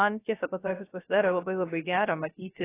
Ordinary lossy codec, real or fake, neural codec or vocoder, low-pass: AAC, 16 kbps; fake; codec, 16 kHz, 0.3 kbps, FocalCodec; 3.6 kHz